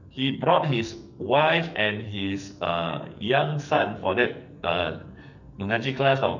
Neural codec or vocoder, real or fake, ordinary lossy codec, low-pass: codec, 44.1 kHz, 2.6 kbps, SNAC; fake; none; 7.2 kHz